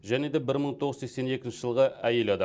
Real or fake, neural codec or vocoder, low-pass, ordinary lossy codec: real; none; none; none